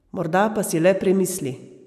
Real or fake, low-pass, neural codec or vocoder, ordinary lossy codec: real; 14.4 kHz; none; none